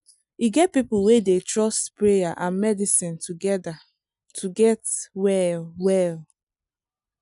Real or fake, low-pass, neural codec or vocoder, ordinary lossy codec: real; 10.8 kHz; none; none